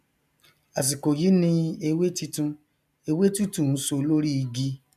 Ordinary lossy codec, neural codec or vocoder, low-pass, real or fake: none; none; 14.4 kHz; real